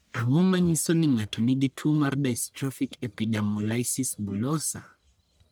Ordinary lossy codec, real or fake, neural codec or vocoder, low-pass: none; fake; codec, 44.1 kHz, 1.7 kbps, Pupu-Codec; none